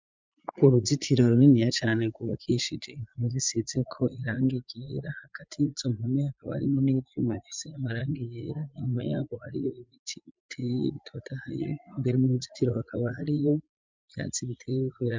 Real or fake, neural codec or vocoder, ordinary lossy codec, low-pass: fake; vocoder, 44.1 kHz, 80 mel bands, Vocos; MP3, 64 kbps; 7.2 kHz